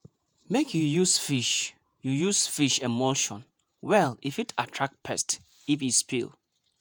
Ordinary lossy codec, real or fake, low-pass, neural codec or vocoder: none; fake; none; vocoder, 48 kHz, 128 mel bands, Vocos